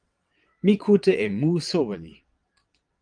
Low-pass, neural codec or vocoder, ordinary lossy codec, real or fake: 9.9 kHz; vocoder, 22.05 kHz, 80 mel bands, WaveNeXt; Opus, 32 kbps; fake